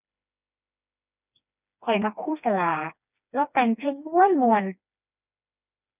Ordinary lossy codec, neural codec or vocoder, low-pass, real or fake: none; codec, 16 kHz, 2 kbps, FreqCodec, smaller model; 3.6 kHz; fake